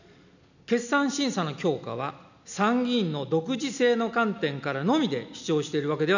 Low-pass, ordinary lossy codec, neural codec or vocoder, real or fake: 7.2 kHz; none; none; real